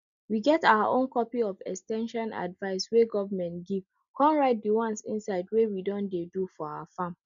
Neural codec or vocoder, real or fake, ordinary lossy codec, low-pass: none; real; none; 7.2 kHz